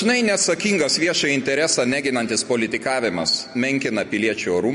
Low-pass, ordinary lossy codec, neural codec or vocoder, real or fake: 14.4 kHz; MP3, 48 kbps; none; real